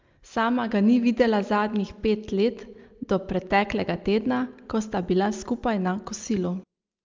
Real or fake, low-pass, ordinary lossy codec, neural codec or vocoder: real; 7.2 kHz; Opus, 24 kbps; none